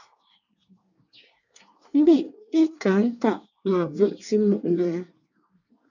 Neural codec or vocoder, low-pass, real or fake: codec, 24 kHz, 1 kbps, SNAC; 7.2 kHz; fake